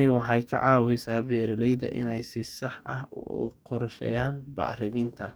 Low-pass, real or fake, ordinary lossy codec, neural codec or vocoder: none; fake; none; codec, 44.1 kHz, 2.6 kbps, DAC